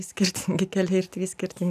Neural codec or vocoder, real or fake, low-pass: none; real; 14.4 kHz